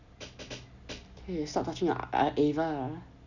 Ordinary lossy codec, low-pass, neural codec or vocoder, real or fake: none; 7.2 kHz; none; real